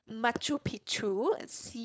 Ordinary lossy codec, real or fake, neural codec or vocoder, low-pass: none; fake; codec, 16 kHz, 4.8 kbps, FACodec; none